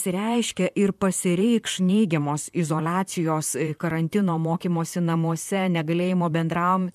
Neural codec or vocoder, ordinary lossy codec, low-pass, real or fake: vocoder, 44.1 kHz, 128 mel bands, Pupu-Vocoder; MP3, 96 kbps; 14.4 kHz; fake